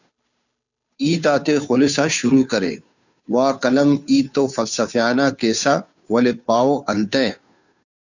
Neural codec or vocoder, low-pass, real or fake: codec, 16 kHz, 2 kbps, FunCodec, trained on Chinese and English, 25 frames a second; 7.2 kHz; fake